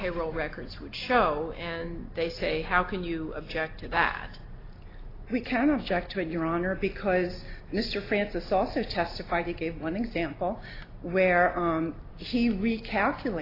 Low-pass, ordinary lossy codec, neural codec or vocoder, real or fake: 5.4 kHz; AAC, 24 kbps; none; real